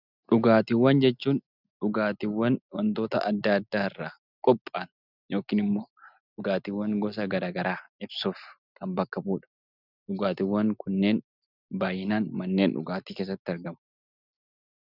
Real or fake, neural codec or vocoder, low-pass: real; none; 5.4 kHz